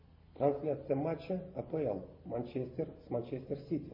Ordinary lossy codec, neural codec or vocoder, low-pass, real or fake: MP3, 24 kbps; none; 5.4 kHz; real